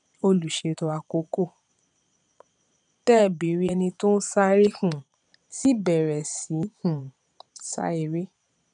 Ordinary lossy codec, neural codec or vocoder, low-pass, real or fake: none; vocoder, 22.05 kHz, 80 mel bands, Vocos; 9.9 kHz; fake